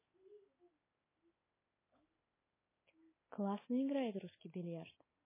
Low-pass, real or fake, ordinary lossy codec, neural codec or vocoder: 3.6 kHz; real; MP3, 16 kbps; none